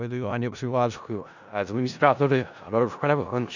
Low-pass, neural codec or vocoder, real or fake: 7.2 kHz; codec, 16 kHz in and 24 kHz out, 0.4 kbps, LongCat-Audio-Codec, four codebook decoder; fake